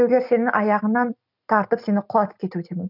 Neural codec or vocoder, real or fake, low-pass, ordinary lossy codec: none; real; 5.4 kHz; none